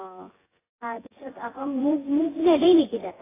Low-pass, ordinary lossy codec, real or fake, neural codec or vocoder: 3.6 kHz; AAC, 16 kbps; fake; vocoder, 24 kHz, 100 mel bands, Vocos